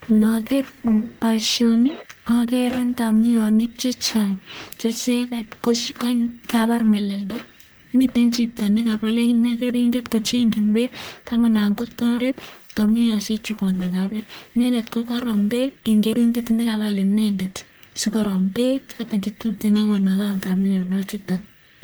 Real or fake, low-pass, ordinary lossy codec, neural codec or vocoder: fake; none; none; codec, 44.1 kHz, 1.7 kbps, Pupu-Codec